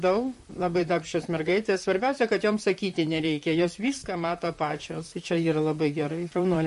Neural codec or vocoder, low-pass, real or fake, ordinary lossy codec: vocoder, 44.1 kHz, 128 mel bands, Pupu-Vocoder; 14.4 kHz; fake; MP3, 48 kbps